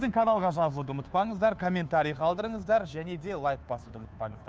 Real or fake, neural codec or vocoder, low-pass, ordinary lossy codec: fake; codec, 16 kHz, 2 kbps, FunCodec, trained on Chinese and English, 25 frames a second; none; none